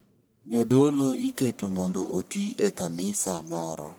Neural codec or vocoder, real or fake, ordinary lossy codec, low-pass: codec, 44.1 kHz, 1.7 kbps, Pupu-Codec; fake; none; none